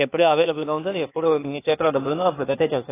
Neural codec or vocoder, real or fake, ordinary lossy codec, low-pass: codec, 16 kHz, 0.8 kbps, ZipCodec; fake; AAC, 16 kbps; 3.6 kHz